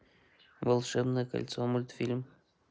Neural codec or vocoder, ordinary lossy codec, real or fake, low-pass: none; none; real; none